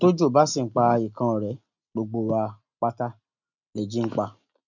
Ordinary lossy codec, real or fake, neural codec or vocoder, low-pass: none; real; none; 7.2 kHz